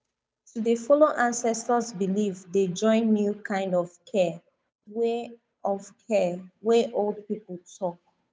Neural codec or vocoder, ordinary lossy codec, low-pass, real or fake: codec, 16 kHz, 8 kbps, FunCodec, trained on Chinese and English, 25 frames a second; none; none; fake